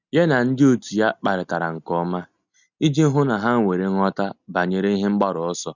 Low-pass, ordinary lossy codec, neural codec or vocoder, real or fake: 7.2 kHz; none; none; real